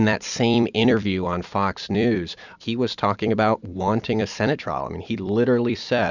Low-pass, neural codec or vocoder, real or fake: 7.2 kHz; vocoder, 44.1 kHz, 128 mel bands every 256 samples, BigVGAN v2; fake